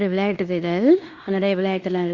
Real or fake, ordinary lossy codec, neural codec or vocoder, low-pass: fake; Opus, 64 kbps; codec, 16 kHz in and 24 kHz out, 0.9 kbps, LongCat-Audio-Codec, fine tuned four codebook decoder; 7.2 kHz